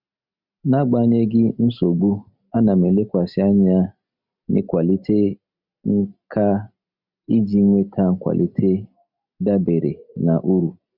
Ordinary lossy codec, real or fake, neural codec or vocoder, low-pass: none; real; none; 5.4 kHz